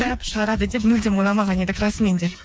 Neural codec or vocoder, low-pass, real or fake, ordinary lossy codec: codec, 16 kHz, 4 kbps, FreqCodec, smaller model; none; fake; none